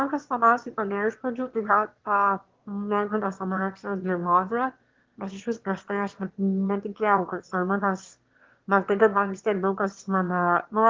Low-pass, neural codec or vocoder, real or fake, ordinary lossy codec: 7.2 kHz; autoencoder, 22.05 kHz, a latent of 192 numbers a frame, VITS, trained on one speaker; fake; Opus, 16 kbps